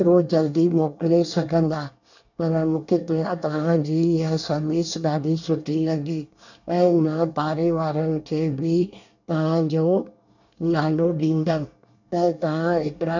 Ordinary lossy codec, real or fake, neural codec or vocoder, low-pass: none; fake; codec, 24 kHz, 1 kbps, SNAC; 7.2 kHz